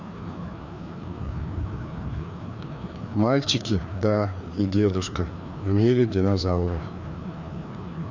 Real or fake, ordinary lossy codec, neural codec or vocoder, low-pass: fake; none; codec, 16 kHz, 2 kbps, FreqCodec, larger model; 7.2 kHz